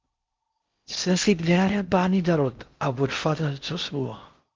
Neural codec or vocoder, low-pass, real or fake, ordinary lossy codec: codec, 16 kHz in and 24 kHz out, 0.6 kbps, FocalCodec, streaming, 4096 codes; 7.2 kHz; fake; Opus, 32 kbps